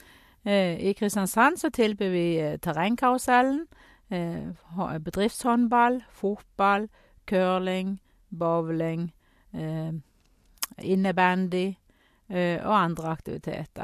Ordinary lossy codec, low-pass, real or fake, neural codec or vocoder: MP3, 64 kbps; 14.4 kHz; real; none